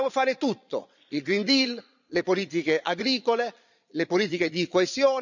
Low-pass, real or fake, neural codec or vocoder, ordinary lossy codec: 7.2 kHz; fake; vocoder, 22.05 kHz, 80 mel bands, Vocos; none